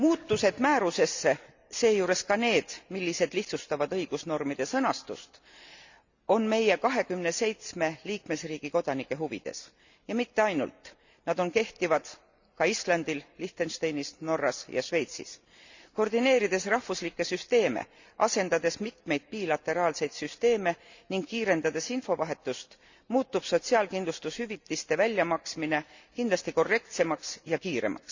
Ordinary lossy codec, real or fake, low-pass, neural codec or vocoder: Opus, 64 kbps; real; 7.2 kHz; none